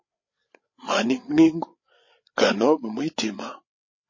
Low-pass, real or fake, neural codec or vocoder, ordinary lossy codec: 7.2 kHz; fake; codec, 16 kHz, 8 kbps, FreqCodec, larger model; MP3, 32 kbps